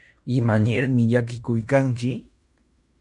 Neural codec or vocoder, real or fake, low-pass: codec, 16 kHz in and 24 kHz out, 0.9 kbps, LongCat-Audio-Codec, fine tuned four codebook decoder; fake; 10.8 kHz